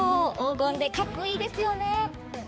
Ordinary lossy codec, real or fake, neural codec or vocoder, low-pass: none; fake; codec, 16 kHz, 4 kbps, X-Codec, HuBERT features, trained on balanced general audio; none